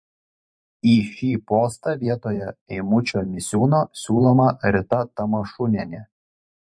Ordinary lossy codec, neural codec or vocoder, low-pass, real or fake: MP3, 48 kbps; vocoder, 44.1 kHz, 128 mel bands every 512 samples, BigVGAN v2; 9.9 kHz; fake